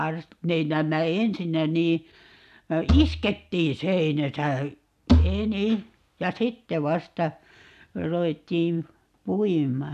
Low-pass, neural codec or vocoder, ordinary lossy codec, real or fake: 14.4 kHz; none; AAC, 96 kbps; real